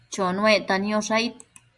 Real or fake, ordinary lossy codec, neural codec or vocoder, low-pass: real; Opus, 64 kbps; none; 10.8 kHz